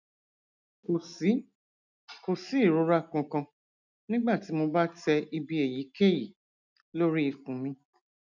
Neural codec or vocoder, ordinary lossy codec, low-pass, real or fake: none; none; 7.2 kHz; real